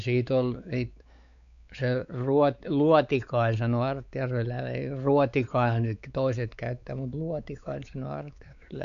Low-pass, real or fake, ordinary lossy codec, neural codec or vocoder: 7.2 kHz; fake; none; codec, 16 kHz, 4 kbps, X-Codec, WavLM features, trained on Multilingual LibriSpeech